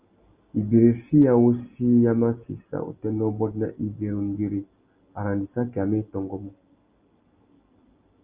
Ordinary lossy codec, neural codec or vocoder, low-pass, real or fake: Opus, 32 kbps; none; 3.6 kHz; real